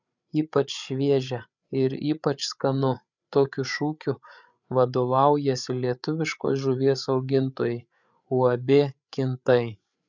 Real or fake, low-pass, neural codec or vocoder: fake; 7.2 kHz; codec, 16 kHz, 8 kbps, FreqCodec, larger model